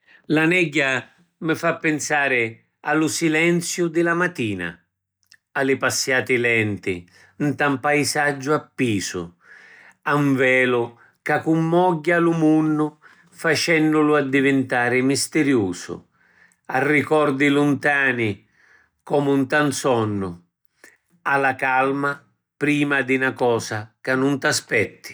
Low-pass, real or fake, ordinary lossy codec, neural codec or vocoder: none; real; none; none